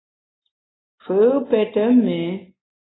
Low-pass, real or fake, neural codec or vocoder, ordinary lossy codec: 7.2 kHz; real; none; AAC, 16 kbps